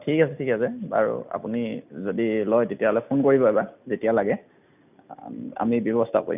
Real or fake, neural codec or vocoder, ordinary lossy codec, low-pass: real; none; none; 3.6 kHz